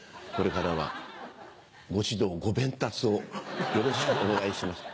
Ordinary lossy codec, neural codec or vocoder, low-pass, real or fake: none; none; none; real